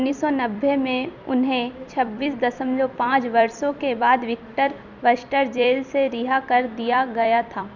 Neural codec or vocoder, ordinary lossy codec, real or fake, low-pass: vocoder, 44.1 kHz, 128 mel bands every 256 samples, BigVGAN v2; none; fake; 7.2 kHz